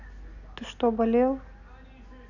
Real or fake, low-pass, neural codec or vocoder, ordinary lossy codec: real; 7.2 kHz; none; none